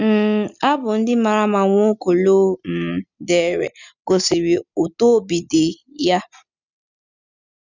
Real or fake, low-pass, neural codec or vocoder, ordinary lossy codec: real; 7.2 kHz; none; none